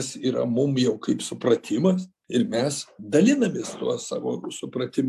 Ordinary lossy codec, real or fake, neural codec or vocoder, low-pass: AAC, 96 kbps; real; none; 14.4 kHz